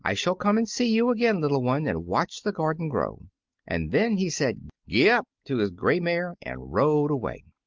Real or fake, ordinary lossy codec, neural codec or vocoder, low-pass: real; Opus, 32 kbps; none; 7.2 kHz